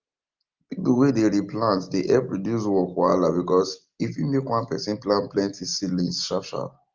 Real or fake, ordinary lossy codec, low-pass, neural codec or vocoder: real; Opus, 24 kbps; 7.2 kHz; none